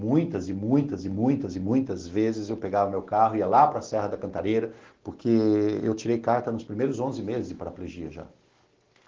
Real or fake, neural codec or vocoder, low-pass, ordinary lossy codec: real; none; 7.2 kHz; Opus, 16 kbps